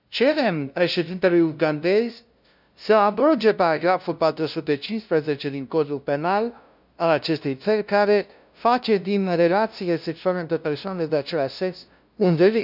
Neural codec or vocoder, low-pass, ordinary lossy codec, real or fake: codec, 16 kHz, 0.5 kbps, FunCodec, trained on LibriTTS, 25 frames a second; 5.4 kHz; none; fake